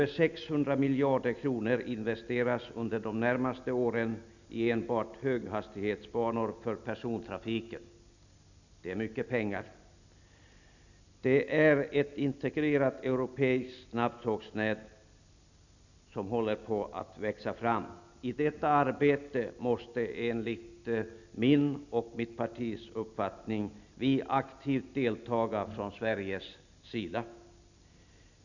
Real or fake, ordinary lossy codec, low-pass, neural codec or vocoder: real; none; 7.2 kHz; none